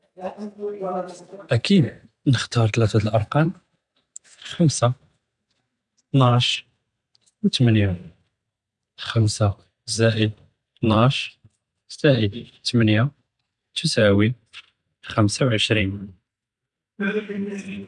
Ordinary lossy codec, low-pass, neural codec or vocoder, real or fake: none; 10.8 kHz; vocoder, 44.1 kHz, 128 mel bands every 512 samples, BigVGAN v2; fake